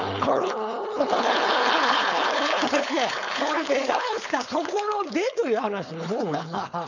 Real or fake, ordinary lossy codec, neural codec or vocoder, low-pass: fake; none; codec, 16 kHz, 4.8 kbps, FACodec; 7.2 kHz